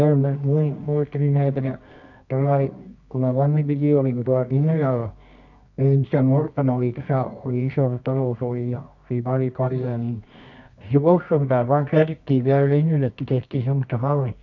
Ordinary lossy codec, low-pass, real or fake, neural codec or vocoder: none; 7.2 kHz; fake; codec, 24 kHz, 0.9 kbps, WavTokenizer, medium music audio release